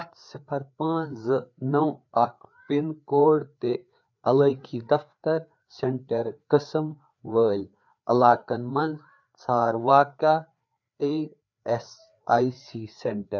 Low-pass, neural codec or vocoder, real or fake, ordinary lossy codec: 7.2 kHz; codec, 16 kHz, 4 kbps, FreqCodec, larger model; fake; none